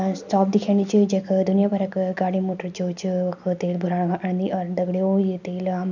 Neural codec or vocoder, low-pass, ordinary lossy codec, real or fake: none; 7.2 kHz; none; real